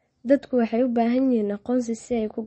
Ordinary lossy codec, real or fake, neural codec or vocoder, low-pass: MP3, 32 kbps; real; none; 10.8 kHz